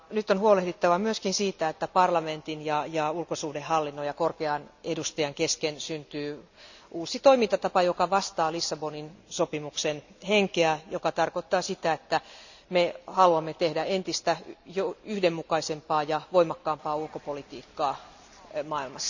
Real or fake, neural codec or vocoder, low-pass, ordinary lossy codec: real; none; 7.2 kHz; none